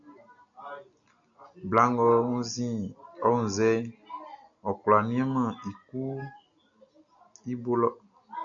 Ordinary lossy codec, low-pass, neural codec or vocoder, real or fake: MP3, 96 kbps; 7.2 kHz; none; real